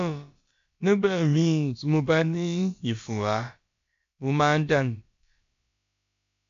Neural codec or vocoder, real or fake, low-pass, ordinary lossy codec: codec, 16 kHz, about 1 kbps, DyCAST, with the encoder's durations; fake; 7.2 kHz; MP3, 48 kbps